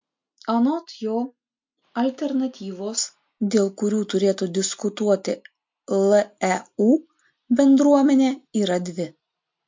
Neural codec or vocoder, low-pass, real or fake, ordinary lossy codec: none; 7.2 kHz; real; MP3, 48 kbps